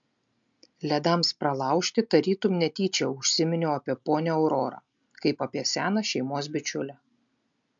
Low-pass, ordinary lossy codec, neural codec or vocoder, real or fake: 7.2 kHz; MP3, 64 kbps; none; real